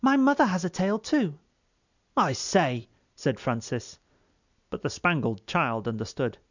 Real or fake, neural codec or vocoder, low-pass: real; none; 7.2 kHz